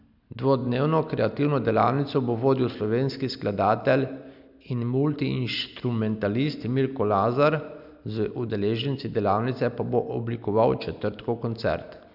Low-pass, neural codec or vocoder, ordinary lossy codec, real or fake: 5.4 kHz; none; none; real